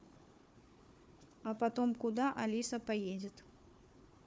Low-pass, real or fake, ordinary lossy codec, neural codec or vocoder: none; fake; none; codec, 16 kHz, 4 kbps, FunCodec, trained on Chinese and English, 50 frames a second